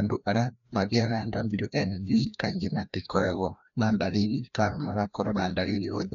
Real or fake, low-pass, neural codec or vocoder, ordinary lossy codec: fake; 7.2 kHz; codec, 16 kHz, 1 kbps, FreqCodec, larger model; none